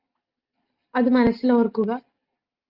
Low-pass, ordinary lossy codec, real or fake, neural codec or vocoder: 5.4 kHz; Opus, 24 kbps; real; none